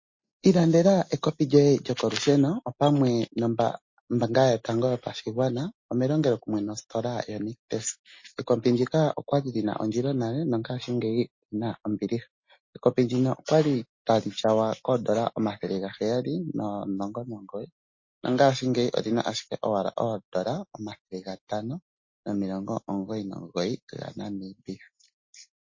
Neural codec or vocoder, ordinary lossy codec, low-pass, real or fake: none; MP3, 32 kbps; 7.2 kHz; real